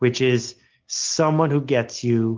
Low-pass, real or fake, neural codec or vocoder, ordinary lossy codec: 7.2 kHz; real; none; Opus, 24 kbps